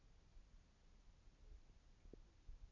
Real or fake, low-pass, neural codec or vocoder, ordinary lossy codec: real; 7.2 kHz; none; none